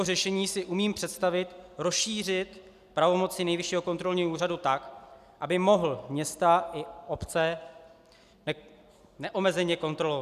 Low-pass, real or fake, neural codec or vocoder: 14.4 kHz; real; none